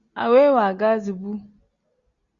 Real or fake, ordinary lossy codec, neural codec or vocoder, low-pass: real; Opus, 64 kbps; none; 7.2 kHz